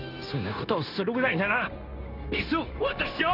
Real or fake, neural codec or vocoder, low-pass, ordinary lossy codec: fake; codec, 16 kHz, 0.9 kbps, LongCat-Audio-Codec; 5.4 kHz; none